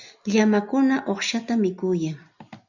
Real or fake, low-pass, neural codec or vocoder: real; 7.2 kHz; none